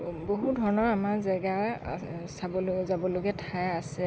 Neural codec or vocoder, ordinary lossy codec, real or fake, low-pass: none; none; real; none